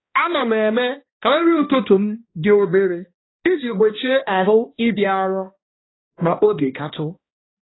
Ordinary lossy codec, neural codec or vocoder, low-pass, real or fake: AAC, 16 kbps; codec, 16 kHz, 1 kbps, X-Codec, HuBERT features, trained on balanced general audio; 7.2 kHz; fake